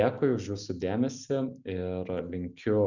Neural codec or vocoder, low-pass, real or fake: none; 7.2 kHz; real